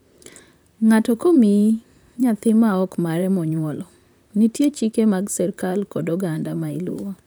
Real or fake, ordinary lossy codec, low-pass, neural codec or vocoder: fake; none; none; vocoder, 44.1 kHz, 128 mel bands, Pupu-Vocoder